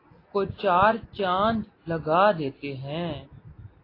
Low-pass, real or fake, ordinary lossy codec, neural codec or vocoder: 5.4 kHz; real; AAC, 24 kbps; none